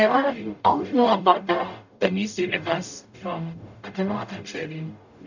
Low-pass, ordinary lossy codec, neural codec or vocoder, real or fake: 7.2 kHz; none; codec, 44.1 kHz, 0.9 kbps, DAC; fake